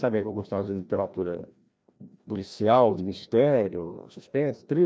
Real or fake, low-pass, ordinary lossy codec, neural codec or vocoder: fake; none; none; codec, 16 kHz, 1 kbps, FreqCodec, larger model